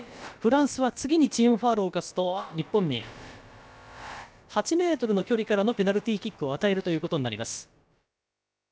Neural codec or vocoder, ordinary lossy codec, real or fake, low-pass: codec, 16 kHz, about 1 kbps, DyCAST, with the encoder's durations; none; fake; none